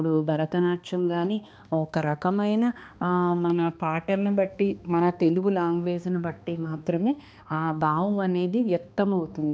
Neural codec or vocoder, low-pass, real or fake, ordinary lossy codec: codec, 16 kHz, 2 kbps, X-Codec, HuBERT features, trained on balanced general audio; none; fake; none